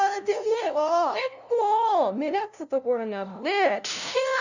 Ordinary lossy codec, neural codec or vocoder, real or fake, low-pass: none; codec, 16 kHz, 0.5 kbps, FunCodec, trained on LibriTTS, 25 frames a second; fake; 7.2 kHz